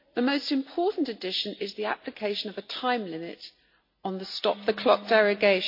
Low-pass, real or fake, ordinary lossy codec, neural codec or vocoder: 5.4 kHz; real; MP3, 32 kbps; none